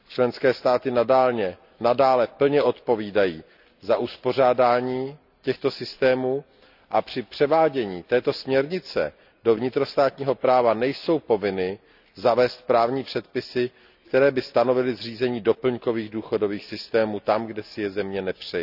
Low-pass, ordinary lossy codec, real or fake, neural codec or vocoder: 5.4 kHz; none; real; none